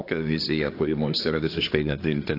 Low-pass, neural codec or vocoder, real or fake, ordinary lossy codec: 5.4 kHz; codec, 24 kHz, 1 kbps, SNAC; fake; AAC, 32 kbps